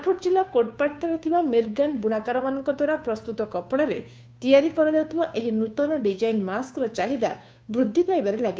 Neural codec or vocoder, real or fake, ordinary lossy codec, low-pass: codec, 16 kHz, 2 kbps, FunCodec, trained on Chinese and English, 25 frames a second; fake; none; none